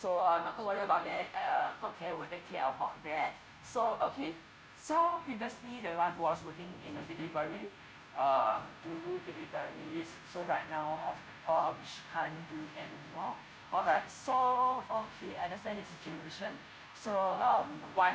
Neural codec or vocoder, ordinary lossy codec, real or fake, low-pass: codec, 16 kHz, 0.5 kbps, FunCodec, trained on Chinese and English, 25 frames a second; none; fake; none